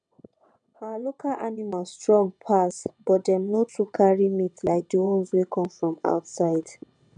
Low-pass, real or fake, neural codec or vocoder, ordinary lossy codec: 9.9 kHz; fake; vocoder, 22.05 kHz, 80 mel bands, WaveNeXt; none